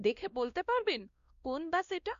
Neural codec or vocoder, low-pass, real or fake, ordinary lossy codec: codec, 16 kHz, 1 kbps, X-Codec, WavLM features, trained on Multilingual LibriSpeech; 7.2 kHz; fake; Opus, 64 kbps